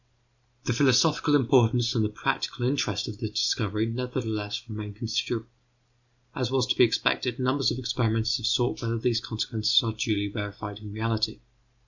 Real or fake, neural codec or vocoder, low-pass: real; none; 7.2 kHz